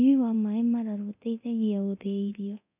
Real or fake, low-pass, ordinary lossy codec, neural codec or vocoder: fake; 3.6 kHz; none; codec, 24 kHz, 0.9 kbps, DualCodec